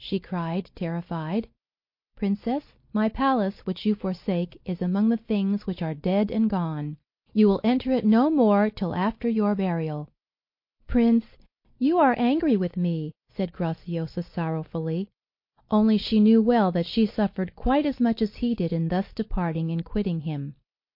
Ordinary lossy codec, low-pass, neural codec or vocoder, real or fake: MP3, 32 kbps; 5.4 kHz; none; real